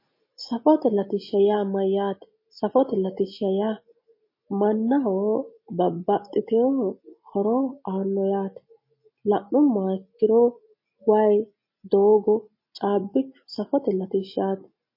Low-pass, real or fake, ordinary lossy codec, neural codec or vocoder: 5.4 kHz; real; MP3, 24 kbps; none